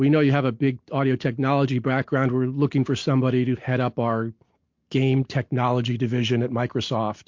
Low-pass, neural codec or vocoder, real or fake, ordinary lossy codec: 7.2 kHz; none; real; MP3, 48 kbps